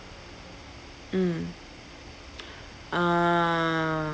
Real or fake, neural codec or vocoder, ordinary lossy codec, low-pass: real; none; none; none